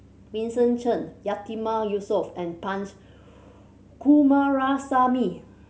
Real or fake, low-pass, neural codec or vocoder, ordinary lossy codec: real; none; none; none